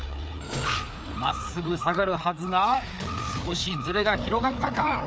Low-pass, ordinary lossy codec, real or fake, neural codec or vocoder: none; none; fake; codec, 16 kHz, 4 kbps, FreqCodec, larger model